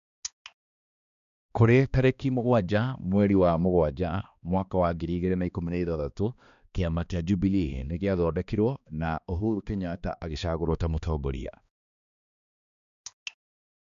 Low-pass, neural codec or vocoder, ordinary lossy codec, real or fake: 7.2 kHz; codec, 16 kHz, 2 kbps, X-Codec, HuBERT features, trained on balanced general audio; none; fake